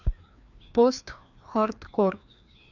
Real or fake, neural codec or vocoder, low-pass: fake; codec, 16 kHz, 2 kbps, FreqCodec, larger model; 7.2 kHz